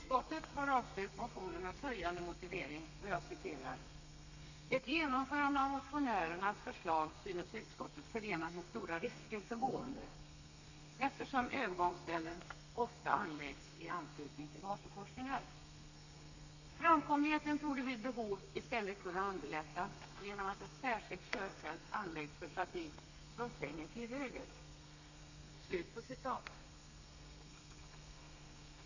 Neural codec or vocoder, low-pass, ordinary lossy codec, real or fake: codec, 32 kHz, 1.9 kbps, SNAC; 7.2 kHz; none; fake